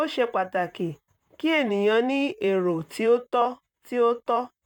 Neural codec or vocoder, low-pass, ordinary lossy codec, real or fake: vocoder, 44.1 kHz, 128 mel bands, Pupu-Vocoder; 19.8 kHz; none; fake